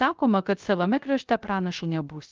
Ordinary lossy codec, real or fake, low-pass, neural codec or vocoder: Opus, 24 kbps; fake; 7.2 kHz; codec, 16 kHz, 0.3 kbps, FocalCodec